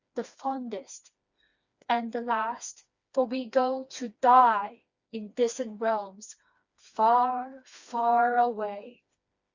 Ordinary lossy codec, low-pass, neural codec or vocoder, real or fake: Opus, 64 kbps; 7.2 kHz; codec, 16 kHz, 2 kbps, FreqCodec, smaller model; fake